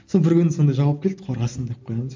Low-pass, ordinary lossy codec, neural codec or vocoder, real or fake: 7.2 kHz; MP3, 48 kbps; none; real